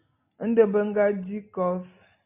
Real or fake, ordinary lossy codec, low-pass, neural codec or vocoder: real; MP3, 32 kbps; 3.6 kHz; none